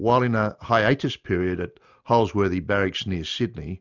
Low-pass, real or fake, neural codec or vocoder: 7.2 kHz; real; none